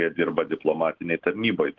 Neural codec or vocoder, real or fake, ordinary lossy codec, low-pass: none; real; Opus, 16 kbps; 7.2 kHz